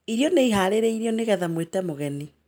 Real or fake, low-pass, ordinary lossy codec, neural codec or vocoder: real; none; none; none